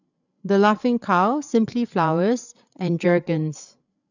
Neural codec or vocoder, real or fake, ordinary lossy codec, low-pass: codec, 16 kHz, 8 kbps, FreqCodec, larger model; fake; none; 7.2 kHz